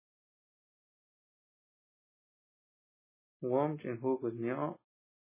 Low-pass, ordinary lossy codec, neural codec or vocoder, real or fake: 3.6 kHz; MP3, 16 kbps; none; real